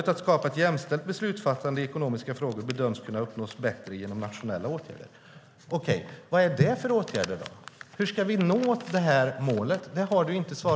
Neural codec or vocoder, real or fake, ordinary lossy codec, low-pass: none; real; none; none